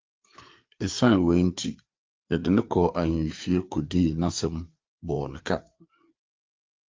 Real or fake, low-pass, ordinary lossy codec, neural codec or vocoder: fake; 7.2 kHz; Opus, 24 kbps; codec, 16 kHz, 4 kbps, FreqCodec, larger model